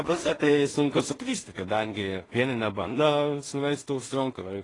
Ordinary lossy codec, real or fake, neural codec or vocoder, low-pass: AAC, 32 kbps; fake; codec, 16 kHz in and 24 kHz out, 0.4 kbps, LongCat-Audio-Codec, two codebook decoder; 10.8 kHz